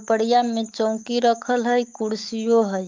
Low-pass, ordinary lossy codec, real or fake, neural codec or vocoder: 7.2 kHz; Opus, 32 kbps; fake; autoencoder, 48 kHz, 128 numbers a frame, DAC-VAE, trained on Japanese speech